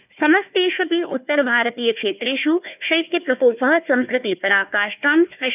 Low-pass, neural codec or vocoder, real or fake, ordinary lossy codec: 3.6 kHz; codec, 16 kHz, 1 kbps, FunCodec, trained on Chinese and English, 50 frames a second; fake; none